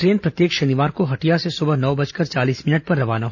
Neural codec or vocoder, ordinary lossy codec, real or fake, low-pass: none; none; real; 7.2 kHz